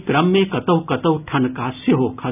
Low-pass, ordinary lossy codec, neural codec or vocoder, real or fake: 3.6 kHz; none; none; real